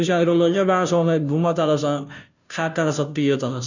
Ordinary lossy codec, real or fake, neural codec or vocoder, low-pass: none; fake; codec, 16 kHz, 0.5 kbps, FunCodec, trained on Chinese and English, 25 frames a second; 7.2 kHz